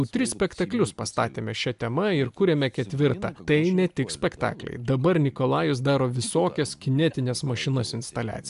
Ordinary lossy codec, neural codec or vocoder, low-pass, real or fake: AAC, 96 kbps; none; 10.8 kHz; real